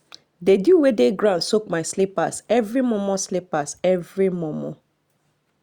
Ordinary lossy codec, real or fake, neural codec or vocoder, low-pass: Opus, 64 kbps; real; none; 19.8 kHz